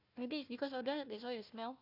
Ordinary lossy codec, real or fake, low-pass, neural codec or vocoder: none; fake; 5.4 kHz; codec, 16 kHz, 1 kbps, FunCodec, trained on Chinese and English, 50 frames a second